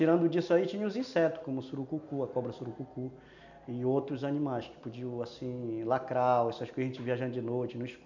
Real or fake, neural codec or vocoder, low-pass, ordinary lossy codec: real; none; 7.2 kHz; none